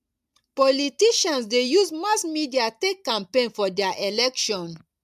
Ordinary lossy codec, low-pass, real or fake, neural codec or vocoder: MP3, 96 kbps; 14.4 kHz; real; none